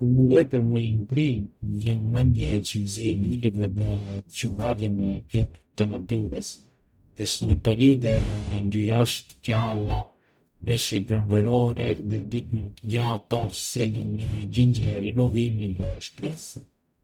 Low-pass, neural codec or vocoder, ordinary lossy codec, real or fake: 19.8 kHz; codec, 44.1 kHz, 0.9 kbps, DAC; none; fake